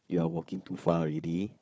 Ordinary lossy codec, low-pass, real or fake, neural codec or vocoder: none; none; fake; codec, 16 kHz, 4 kbps, FunCodec, trained on Chinese and English, 50 frames a second